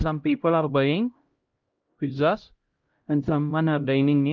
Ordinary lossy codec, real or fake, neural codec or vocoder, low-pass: Opus, 32 kbps; fake; codec, 16 kHz, 0.5 kbps, X-Codec, HuBERT features, trained on LibriSpeech; 7.2 kHz